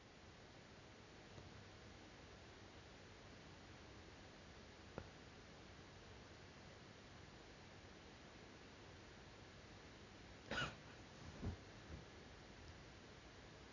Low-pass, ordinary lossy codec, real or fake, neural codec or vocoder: 7.2 kHz; none; real; none